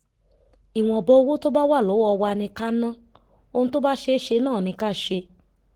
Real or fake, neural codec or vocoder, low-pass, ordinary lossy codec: fake; codec, 44.1 kHz, 7.8 kbps, Pupu-Codec; 19.8 kHz; Opus, 16 kbps